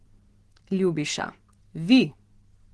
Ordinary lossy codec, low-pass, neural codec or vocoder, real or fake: Opus, 16 kbps; 10.8 kHz; none; real